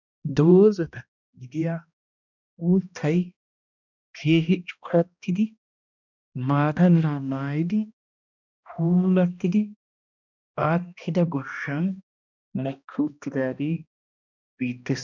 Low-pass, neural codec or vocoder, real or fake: 7.2 kHz; codec, 16 kHz, 1 kbps, X-Codec, HuBERT features, trained on balanced general audio; fake